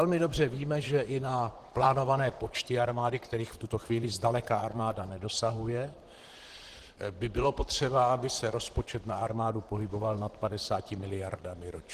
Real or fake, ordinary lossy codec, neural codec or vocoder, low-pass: fake; Opus, 16 kbps; vocoder, 44.1 kHz, 128 mel bands, Pupu-Vocoder; 14.4 kHz